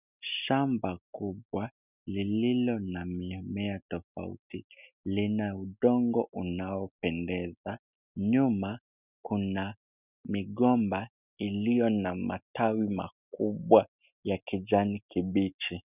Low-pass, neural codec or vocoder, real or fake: 3.6 kHz; none; real